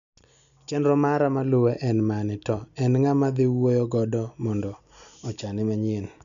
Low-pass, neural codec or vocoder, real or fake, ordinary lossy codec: 7.2 kHz; none; real; none